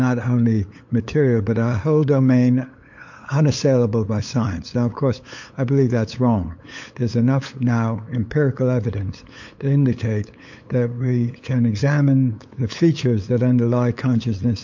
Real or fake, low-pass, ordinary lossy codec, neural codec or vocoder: fake; 7.2 kHz; MP3, 48 kbps; codec, 16 kHz, 8 kbps, FunCodec, trained on LibriTTS, 25 frames a second